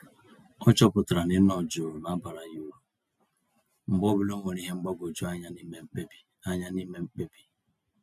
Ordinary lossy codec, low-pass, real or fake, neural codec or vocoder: none; 14.4 kHz; real; none